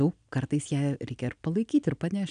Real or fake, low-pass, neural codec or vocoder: real; 9.9 kHz; none